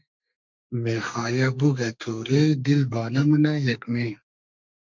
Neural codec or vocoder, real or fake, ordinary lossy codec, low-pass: codec, 32 kHz, 1.9 kbps, SNAC; fake; MP3, 64 kbps; 7.2 kHz